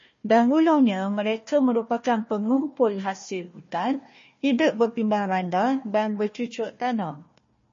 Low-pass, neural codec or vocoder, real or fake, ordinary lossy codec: 7.2 kHz; codec, 16 kHz, 1 kbps, FunCodec, trained on Chinese and English, 50 frames a second; fake; MP3, 32 kbps